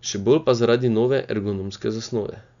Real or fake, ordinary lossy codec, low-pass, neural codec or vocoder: real; none; 7.2 kHz; none